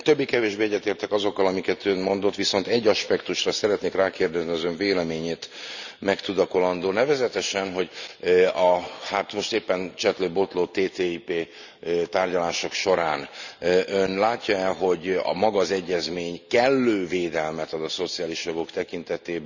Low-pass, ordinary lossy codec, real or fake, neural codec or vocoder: 7.2 kHz; none; real; none